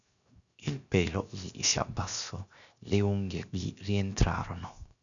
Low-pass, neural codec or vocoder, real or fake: 7.2 kHz; codec, 16 kHz, 0.7 kbps, FocalCodec; fake